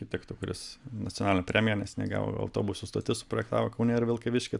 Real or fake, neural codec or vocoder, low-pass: real; none; 10.8 kHz